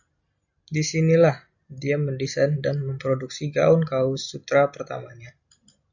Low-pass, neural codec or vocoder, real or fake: 7.2 kHz; none; real